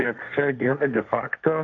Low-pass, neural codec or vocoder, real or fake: 7.2 kHz; codec, 16 kHz, 1.1 kbps, Voila-Tokenizer; fake